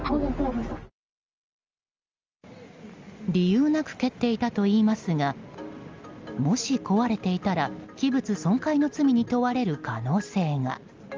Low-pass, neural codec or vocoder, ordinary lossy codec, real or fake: 7.2 kHz; none; Opus, 32 kbps; real